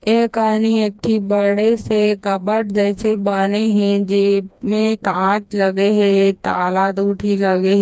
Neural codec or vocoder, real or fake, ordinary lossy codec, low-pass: codec, 16 kHz, 2 kbps, FreqCodec, smaller model; fake; none; none